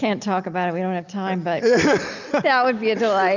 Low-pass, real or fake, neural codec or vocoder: 7.2 kHz; real; none